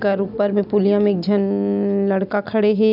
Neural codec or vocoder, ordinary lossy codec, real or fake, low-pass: none; none; real; 5.4 kHz